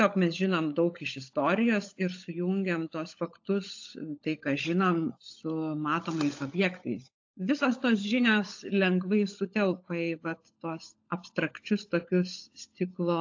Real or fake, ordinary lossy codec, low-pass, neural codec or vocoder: fake; AAC, 48 kbps; 7.2 kHz; codec, 16 kHz, 16 kbps, FunCodec, trained on LibriTTS, 50 frames a second